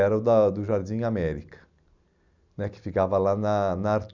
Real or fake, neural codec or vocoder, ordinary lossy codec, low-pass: real; none; none; 7.2 kHz